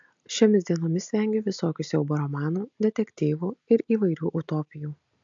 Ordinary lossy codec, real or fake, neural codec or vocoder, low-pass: AAC, 64 kbps; real; none; 7.2 kHz